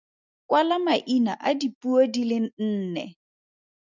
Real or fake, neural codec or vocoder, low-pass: real; none; 7.2 kHz